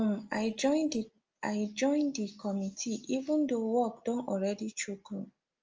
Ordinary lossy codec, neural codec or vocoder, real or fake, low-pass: Opus, 24 kbps; none; real; 7.2 kHz